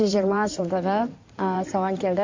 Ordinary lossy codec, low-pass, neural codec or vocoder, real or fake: MP3, 48 kbps; 7.2 kHz; codec, 16 kHz in and 24 kHz out, 2.2 kbps, FireRedTTS-2 codec; fake